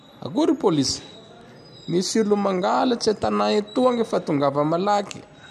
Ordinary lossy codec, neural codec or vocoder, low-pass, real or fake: none; none; 14.4 kHz; real